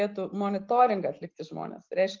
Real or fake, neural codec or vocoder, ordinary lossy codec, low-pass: real; none; Opus, 24 kbps; 7.2 kHz